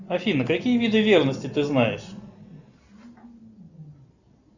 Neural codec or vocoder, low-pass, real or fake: none; 7.2 kHz; real